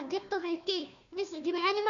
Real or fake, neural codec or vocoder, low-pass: fake; codec, 16 kHz, 1 kbps, FunCodec, trained on Chinese and English, 50 frames a second; 7.2 kHz